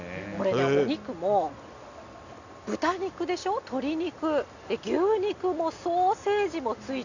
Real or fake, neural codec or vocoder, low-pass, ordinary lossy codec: real; none; 7.2 kHz; none